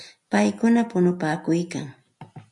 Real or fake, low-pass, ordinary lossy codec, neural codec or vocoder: real; 10.8 kHz; MP3, 64 kbps; none